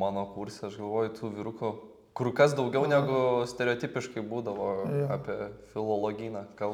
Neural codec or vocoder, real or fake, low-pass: none; real; 19.8 kHz